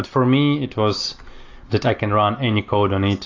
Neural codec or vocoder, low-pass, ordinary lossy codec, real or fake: none; 7.2 kHz; AAC, 48 kbps; real